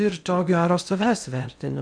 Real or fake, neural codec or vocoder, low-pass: fake; codec, 16 kHz in and 24 kHz out, 0.6 kbps, FocalCodec, streaming, 2048 codes; 9.9 kHz